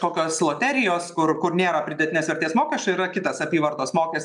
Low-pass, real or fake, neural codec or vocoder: 10.8 kHz; real; none